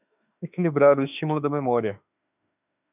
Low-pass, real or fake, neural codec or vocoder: 3.6 kHz; fake; autoencoder, 48 kHz, 32 numbers a frame, DAC-VAE, trained on Japanese speech